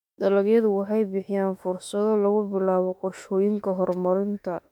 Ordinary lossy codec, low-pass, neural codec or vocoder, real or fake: MP3, 96 kbps; 19.8 kHz; autoencoder, 48 kHz, 32 numbers a frame, DAC-VAE, trained on Japanese speech; fake